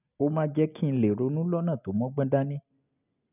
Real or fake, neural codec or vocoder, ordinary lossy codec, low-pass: real; none; none; 3.6 kHz